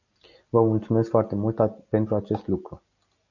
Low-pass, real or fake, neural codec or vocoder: 7.2 kHz; real; none